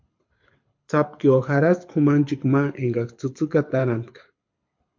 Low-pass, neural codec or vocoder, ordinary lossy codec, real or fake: 7.2 kHz; codec, 24 kHz, 6 kbps, HILCodec; MP3, 48 kbps; fake